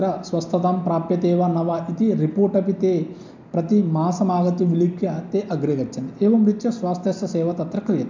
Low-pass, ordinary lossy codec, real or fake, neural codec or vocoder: 7.2 kHz; MP3, 64 kbps; real; none